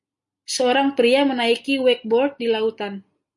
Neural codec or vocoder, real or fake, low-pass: none; real; 10.8 kHz